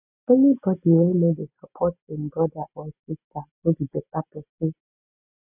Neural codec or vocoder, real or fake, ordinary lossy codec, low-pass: none; real; none; 3.6 kHz